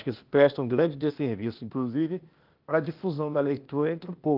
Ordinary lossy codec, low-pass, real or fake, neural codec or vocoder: Opus, 32 kbps; 5.4 kHz; fake; codec, 16 kHz, 0.8 kbps, ZipCodec